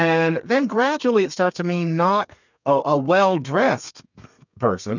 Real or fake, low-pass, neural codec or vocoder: fake; 7.2 kHz; codec, 32 kHz, 1.9 kbps, SNAC